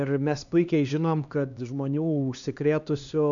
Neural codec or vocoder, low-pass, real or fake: codec, 16 kHz, 2 kbps, X-Codec, HuBERT features, trained on LibriSpeech; 7.2 kHz; fake